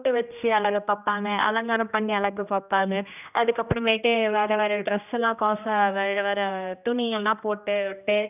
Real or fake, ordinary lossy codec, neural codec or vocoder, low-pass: fake; none; codec, 16 kHz, 1 kbps, X-Codec, HuBERT features, trained on general audio; 3.6 kHz